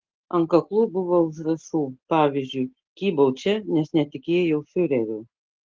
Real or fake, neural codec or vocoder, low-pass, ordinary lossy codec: real; none; 7.2 kHz; Opus, 16 kbps